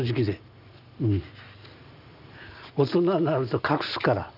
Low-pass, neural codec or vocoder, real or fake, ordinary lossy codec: 5.4 kHz; none; real; none